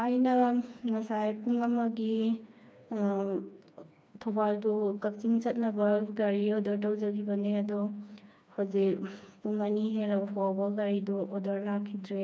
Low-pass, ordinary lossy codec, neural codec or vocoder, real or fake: none; none; codec, 16 kHz, 2 kbps, FreqCodec, smaller model; fake